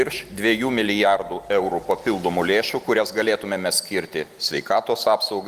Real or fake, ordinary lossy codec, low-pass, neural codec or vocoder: real; Opus, 24 kbps; 14.4 kHz; none